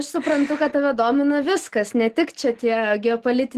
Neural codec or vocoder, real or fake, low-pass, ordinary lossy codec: none; real; 14.4 kHz; Opus, 16 kbps